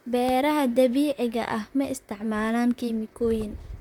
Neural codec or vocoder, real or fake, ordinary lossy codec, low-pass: vocoder, 44.1 kHz, 128 mel bands, Pupu-Vocoder; fake; none; 19.8 kHz